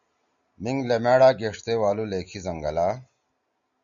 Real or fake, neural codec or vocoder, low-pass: real; none; 7.2 kHz